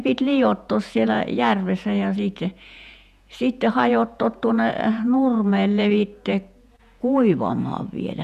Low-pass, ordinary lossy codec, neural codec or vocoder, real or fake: 14.4 kHz; none; vocoder, 48 kHz, 128 mel bands, Vocos; fake